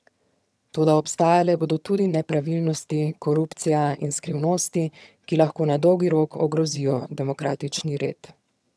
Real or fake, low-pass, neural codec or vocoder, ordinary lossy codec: fake; none; vocoder, 22.05 kHz, 80 mel bands, HiFi-GAN; none